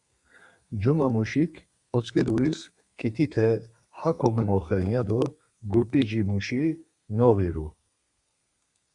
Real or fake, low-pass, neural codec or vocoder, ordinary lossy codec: fake; 10.8 kHz; codec, 32 kHz, 1.9 kbps, SNAC; Opus, 64 kbps